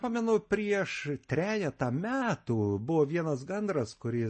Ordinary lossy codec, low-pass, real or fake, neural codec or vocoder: MP3, 32 kbps; 10.8 kHz; real; none